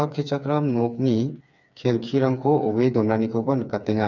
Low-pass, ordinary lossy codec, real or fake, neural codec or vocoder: 7.2 kHz; none; fake; codec, 16 kHz, 4 kbps, FreqCodec, smaller model